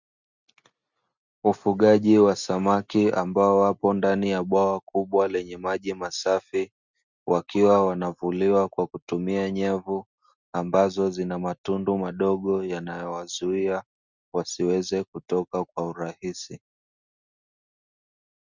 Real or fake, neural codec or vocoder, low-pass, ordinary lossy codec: real; none; 7.2 kHz; Opus, 64 kbps